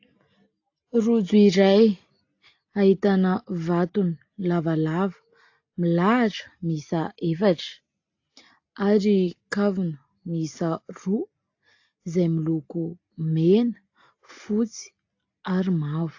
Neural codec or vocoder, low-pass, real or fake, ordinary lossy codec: none; 7.2 kHz; real; AAC, 48 kbps